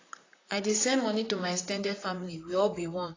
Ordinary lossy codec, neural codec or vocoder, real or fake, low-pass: AAC, 32 kbps; vocoder, 44.1 kHz, 128 mel bands, Pupu-Vocoder; fake; 7.2 kHz